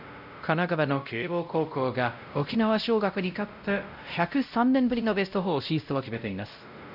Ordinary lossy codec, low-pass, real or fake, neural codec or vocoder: none; 5.4 kHz; fake; codec, 16 kHz, 0.5 kbps, X-Codec, WavLM features, trained on Multilingual LibriSpeech